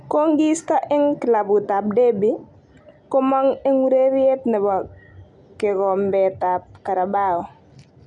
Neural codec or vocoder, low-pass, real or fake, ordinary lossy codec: none; 10.8 kHz; real; none